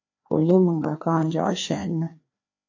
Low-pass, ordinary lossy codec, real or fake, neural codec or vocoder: 7.2 kHz; AAC, 48 kbps; fake; codec, 16 kHz, 2 kbps, FreqCodec, larger model